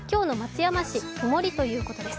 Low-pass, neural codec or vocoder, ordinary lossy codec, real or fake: none; none; none; real